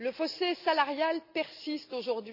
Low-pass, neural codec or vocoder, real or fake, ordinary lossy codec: 5.4 kHz; none; real; none